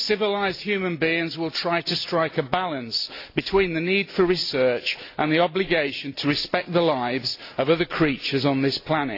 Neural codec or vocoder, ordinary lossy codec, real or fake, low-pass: none; AAC, 32 kbps; real; 5.4 kHz